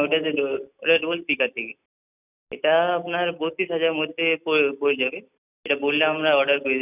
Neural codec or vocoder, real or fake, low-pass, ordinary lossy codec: none; real; 3.6 kHz; none